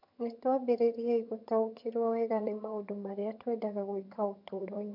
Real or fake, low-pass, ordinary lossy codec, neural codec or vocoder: fake; 5.4 kHz; MP3, 48 kbps; vocoder, 22.05 kHz, 80 mel bands, HiFi-GAN